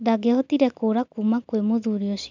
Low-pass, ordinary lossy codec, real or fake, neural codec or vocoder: 7.2 kHz; none; real; none